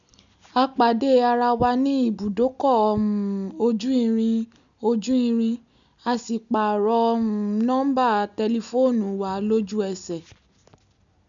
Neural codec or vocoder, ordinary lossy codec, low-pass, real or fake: none; none; 7.2 kHz; real